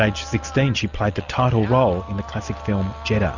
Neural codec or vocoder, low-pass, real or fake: none; 7.2 kHz; real